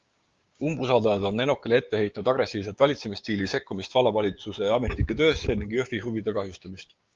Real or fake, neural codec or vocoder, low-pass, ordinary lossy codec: fake; codec, 16 kHz, 6 kbps, DAC; 7.2 kHz; Opus, 32 kbps